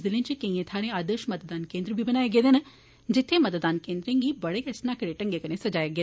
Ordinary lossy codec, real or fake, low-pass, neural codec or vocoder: none; real; none; none